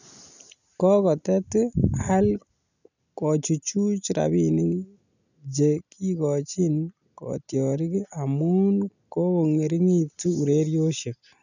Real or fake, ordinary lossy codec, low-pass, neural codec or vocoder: real; none; 7.2 kHz; none